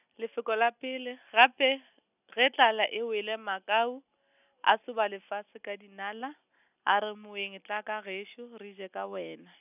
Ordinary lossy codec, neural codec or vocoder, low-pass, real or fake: none; none; 3.6 kHz; real